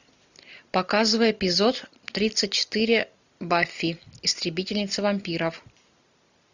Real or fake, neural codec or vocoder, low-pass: real; none; 7.2 kHz